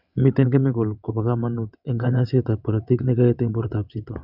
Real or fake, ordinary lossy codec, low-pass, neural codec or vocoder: fake; none; 5.4 kHz; vocoder, 22.05 kHz, 80 mel bands, WaveNeXt